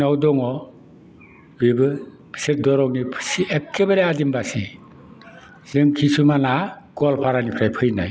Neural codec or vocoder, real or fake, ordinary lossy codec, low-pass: none; real; none; none